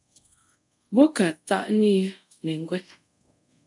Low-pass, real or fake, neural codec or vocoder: 10.8 kHz; fake; codec, 24 kHz, 0.5 kbps, DualCodec